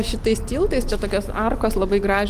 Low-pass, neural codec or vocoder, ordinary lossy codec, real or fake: 14.4 kHz; none; Opus, 24 kbps; real